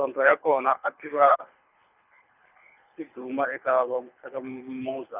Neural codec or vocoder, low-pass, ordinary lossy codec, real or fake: codec, 24 kHz, 3 kbps, HILCodec; 3.6 kHz; none; fake